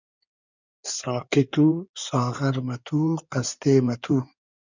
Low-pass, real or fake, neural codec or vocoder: 7.2 kHz; fake; codec, 16 kHz in and 24 kHz out, 2.2 kbps, FireRedTTS-2 codec